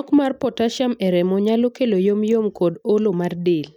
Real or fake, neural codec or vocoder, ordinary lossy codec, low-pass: real; none; none; 19.8 kHz